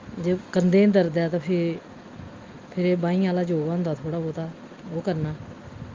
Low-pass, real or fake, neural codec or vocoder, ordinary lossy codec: 7.2 kHz; real; none; Opus, 32 kbps